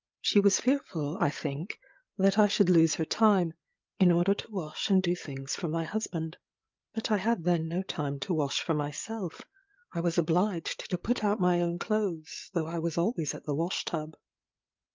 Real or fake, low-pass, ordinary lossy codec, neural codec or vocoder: fake; 7.2 kHz; Opus, 24 kbps; codec, 16 kHz, 8 kbps, FreqCodec, larger model